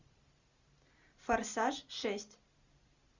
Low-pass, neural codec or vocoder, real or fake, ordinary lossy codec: 7.2 kHz; none; real; Opus, 64 kbps